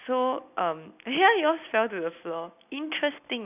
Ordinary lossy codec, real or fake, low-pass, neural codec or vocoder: none; real; 3.6 kHz; none